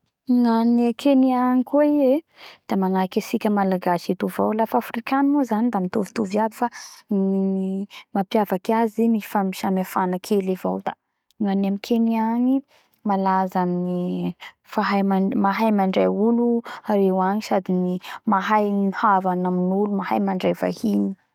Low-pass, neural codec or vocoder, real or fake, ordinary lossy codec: 19.8 kHz; codec, 44.1 kHz, 7.8 kbps, DAC; fake; none